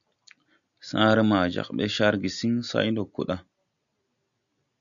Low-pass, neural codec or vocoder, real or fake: 7.2 kHz; none; real